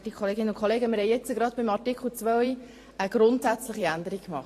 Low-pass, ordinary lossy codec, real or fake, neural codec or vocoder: 14.4 kHz; AAC, 48 kbps; fake; vocoder, 44.1 kHz, 128 mel bands every 512 samples, BigVGAN v2